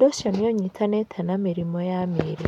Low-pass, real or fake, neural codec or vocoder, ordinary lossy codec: 19.8 kHz; real; none; none